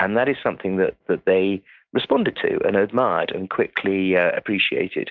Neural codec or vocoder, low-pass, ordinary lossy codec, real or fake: none; 7.2 kHz; MP3, 64 kbps; real